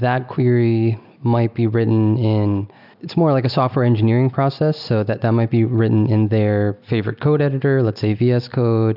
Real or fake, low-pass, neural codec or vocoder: real; 5.4 kHz; none